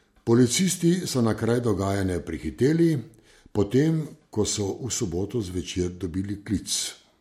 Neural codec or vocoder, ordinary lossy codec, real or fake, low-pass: none; MP3, 64 kbps; real; 19.8 kHz